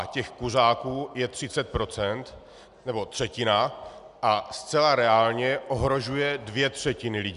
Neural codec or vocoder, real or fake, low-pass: none; real; 10.8 kHz